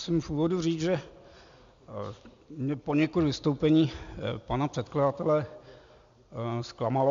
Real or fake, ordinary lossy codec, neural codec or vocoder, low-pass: real; MP3, 64 kbps; none; 7.2 kHz